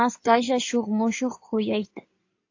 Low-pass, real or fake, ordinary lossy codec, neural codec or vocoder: 7.2 kHz; fake; AAC, 48 kbps; codec, 16 kHz in and 24 kHz out, 2.2 kbps, FireRedTTS-2 codec